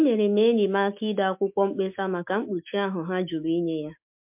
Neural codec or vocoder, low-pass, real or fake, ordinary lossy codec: autoencoder, 48 kHz, 128 numbers a frame, DAC-VAE, trained on Japanese speech; 3.6 kHz; fake; MP3, 32 kbps